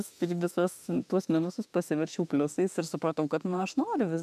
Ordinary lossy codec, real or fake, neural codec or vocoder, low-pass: MP3, 96 kbps; fake; autoencoder, 48 kHz, 32 numbers a frame, DAC-VAE, trained on Japanese speech; 14.4 kHz